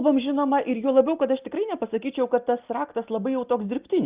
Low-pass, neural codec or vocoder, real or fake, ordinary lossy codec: 3.6 kHz; none; real; Opus, 24 kbps